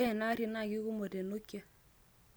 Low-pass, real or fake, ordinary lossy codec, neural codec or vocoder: none; real; none; none